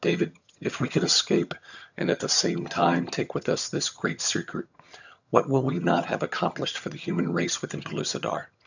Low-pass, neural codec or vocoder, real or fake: 7.2 kHz; vocoder, 22.05 kHz, 80 mel bands, HiFi-GAN; fake